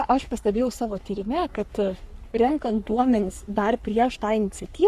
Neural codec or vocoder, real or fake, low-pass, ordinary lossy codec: codec, 44.1 kHz, 3.4 kbps, Pupu-Codec; fake; 14.4 kHz; Opus, 64 kbps